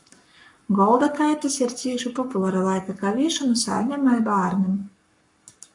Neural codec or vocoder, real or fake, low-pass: codec, 44.1 kHz, 7.8 kbps, Pupu-Codec; fake; 10.8 kHz